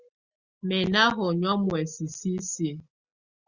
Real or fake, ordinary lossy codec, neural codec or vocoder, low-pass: real; Opus, 64 kbps; none; 7.2 kHz